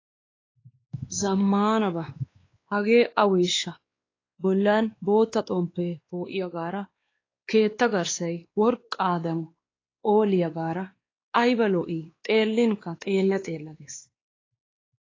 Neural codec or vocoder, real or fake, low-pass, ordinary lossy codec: codec, 16 kHz, 2 kbps, X-Codec, WavLM features, trained on Multilingual LibriSpeech; fake; 7.2 kHz; AAC, 32 kbps